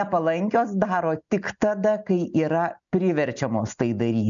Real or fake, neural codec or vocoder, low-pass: real; none; 7.2 kHz